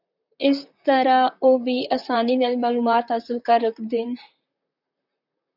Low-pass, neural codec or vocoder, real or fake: 5.4 kHz; vocoder, 44.1 kHz, 128 mel bands, Pupu-Vocoder; fake